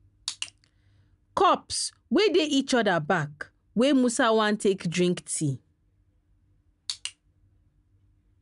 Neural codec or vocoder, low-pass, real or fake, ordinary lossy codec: none; 10.8 kHz; real; none